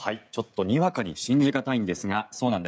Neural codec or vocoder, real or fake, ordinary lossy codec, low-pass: codec, 16 kHz, 16 kbps, FreqCodec, smaller model; fake; none; none